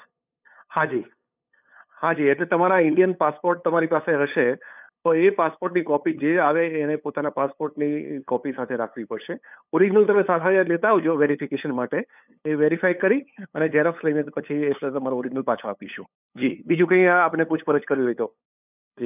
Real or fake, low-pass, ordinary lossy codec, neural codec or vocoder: fake; 3.6 kHz; none; codec, 16 kHz, 8 kbps, FunCodec, trained on LibriTTS, 25 frames a second